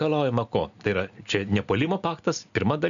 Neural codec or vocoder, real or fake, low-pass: none; real; 7.2 kHz